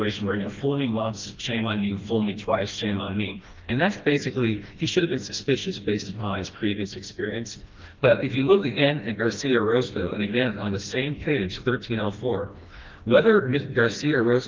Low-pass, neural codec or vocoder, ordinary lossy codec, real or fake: 7.2 kHz; codec, 16 kHz, 1 kbps, FreqCodec, smaller model; Opus, 32 kbps; fake